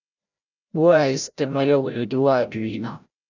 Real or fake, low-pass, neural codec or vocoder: fake; 7.2 kHz; codec, 16 kHz, 0.5 kbps, FreqCodec, larger model